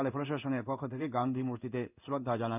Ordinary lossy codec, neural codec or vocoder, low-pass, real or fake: none; codec, 16 kHz in and 24 kHz out, 1 kbps, XY-Tokenizer; 3.6 kHz; fake